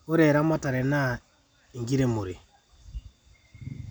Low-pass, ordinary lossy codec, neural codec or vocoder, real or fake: none; none; none; real